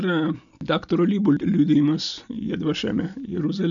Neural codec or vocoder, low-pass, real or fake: none; 7.2 kHz; real